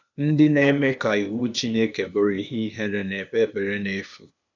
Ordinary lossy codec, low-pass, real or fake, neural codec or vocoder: none; 7.2 kHz; fake; codec, 16 kHz, 0.8 kbps, ZipCodec